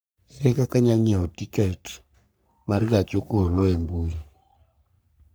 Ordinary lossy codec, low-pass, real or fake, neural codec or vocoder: none; none; fake; codec, 44.1 kHz, 3.4 kbps, Pupu-Codec